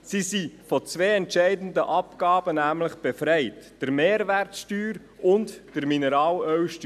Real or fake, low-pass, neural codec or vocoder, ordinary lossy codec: real; 14.4 kHz; none; none